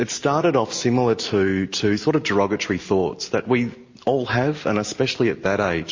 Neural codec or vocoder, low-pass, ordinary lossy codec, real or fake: none; 7.2 kHz; MP3, 32 kbps; real